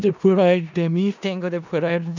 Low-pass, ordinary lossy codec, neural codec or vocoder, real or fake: 7.2 kHz; none; codec, 16 kHz in and 24 kHz out, 0.4 kbps, LongCat-Audio-Codec, four codebook decoder; fake